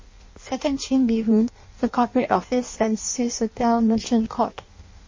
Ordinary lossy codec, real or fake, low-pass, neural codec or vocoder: MP3, 32 kbps; fake; 7.2 kHz; codec, 16 kHz in and 24 kHz out, 0.6 kbps, FireRedTTS-2 codec